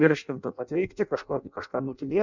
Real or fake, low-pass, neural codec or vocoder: fake; 7.2 kHz; codec, 16 kHz in and 24 kHz out, 0.6 kbps, FireRedTTS-2 codec